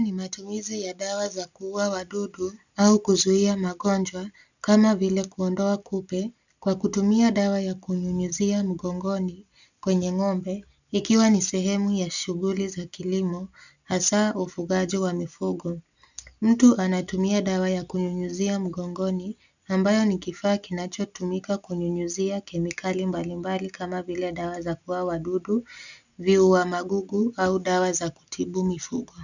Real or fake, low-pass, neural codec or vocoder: real; 7.2 kHz; none